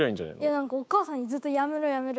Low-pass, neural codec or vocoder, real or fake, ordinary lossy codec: none; codec, 16 kHz, 6 kbps, DAC; fake; none